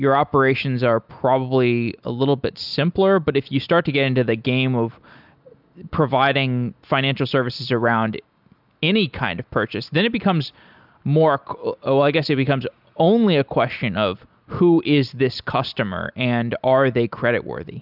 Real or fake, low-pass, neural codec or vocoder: real; 5.4 kHz; none